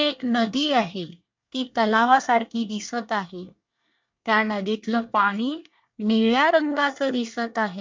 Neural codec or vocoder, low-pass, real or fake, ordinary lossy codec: codec, 24 kHz, 1 kbps, SNAC; 7.2 kHz; fake; MP3, 64 kbps